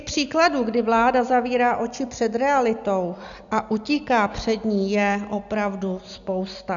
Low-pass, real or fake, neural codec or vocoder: 7.2 kHz; real; none